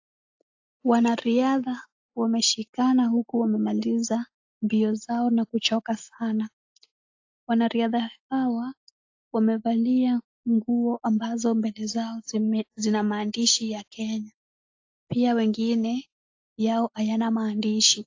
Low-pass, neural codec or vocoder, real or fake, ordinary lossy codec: 7.2 kHz; none; real; AAC, 48 kbps